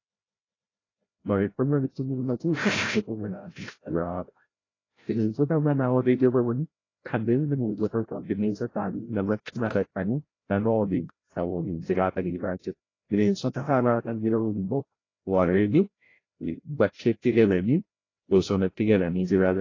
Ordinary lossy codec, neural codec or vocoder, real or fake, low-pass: AAC, 32 kbps; codec, 16 kHz, 0.5 kbps, FreqCodec, larger model; fake; 7.2 kHz